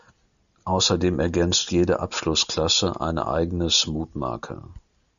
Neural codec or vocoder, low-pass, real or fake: none; 7.2 kHz; real